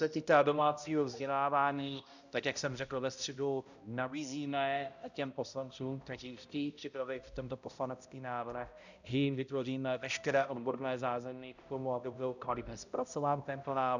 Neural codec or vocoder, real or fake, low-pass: codec, 16 kHz, 0.5 kbps, X-Codec, HuBERT features, trained on balanced general audio; fake; 7.2 kHz